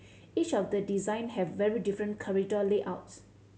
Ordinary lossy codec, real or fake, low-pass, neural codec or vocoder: none; real; none; none